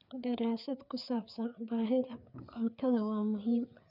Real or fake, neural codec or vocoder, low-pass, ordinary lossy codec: fake; codec, 16 kHz, 4 kbps, FreqCodec, larger model; 5.4 kHz; none